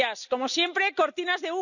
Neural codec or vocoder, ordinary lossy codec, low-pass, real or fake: none; none; 7.2 kHz; real